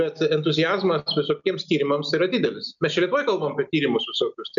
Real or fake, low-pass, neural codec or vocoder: real; 7.2 kHz; none